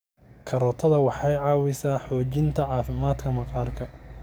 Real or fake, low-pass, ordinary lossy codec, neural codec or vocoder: fake; none; none; codec, 44.1 kHz, 7.8 kbps, DAC